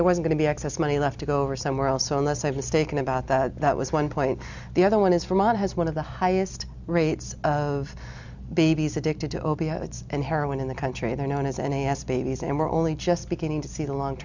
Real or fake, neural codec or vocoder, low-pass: real; none; 7.2 kHz